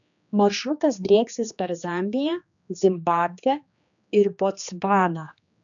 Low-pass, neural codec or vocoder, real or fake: 7.2 kHz; codec, 16 kHz, 2 kbps, X-Codec, HuBERT features, trained on general audio; fake